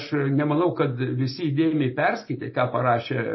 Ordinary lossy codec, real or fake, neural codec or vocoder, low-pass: MP3, 24 kbps; real; none; 7.2 kHz